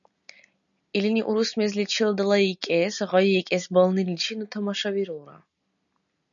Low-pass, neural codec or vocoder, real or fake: 7.2 kHz; none; real